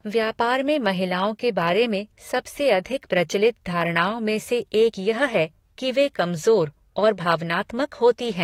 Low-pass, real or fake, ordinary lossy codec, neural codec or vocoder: 19.8 kHz; fake; AAC, 48 kbps; codec, 44.1 kHz, 7.8 kbps, DAC